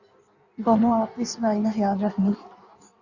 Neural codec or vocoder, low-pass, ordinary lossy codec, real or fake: codec, 16 kHz in and 24 kHz out, 1.1 kbps, FireRedTTS-2 codec; 7.2 kHz; Opus, 64 kbps; fake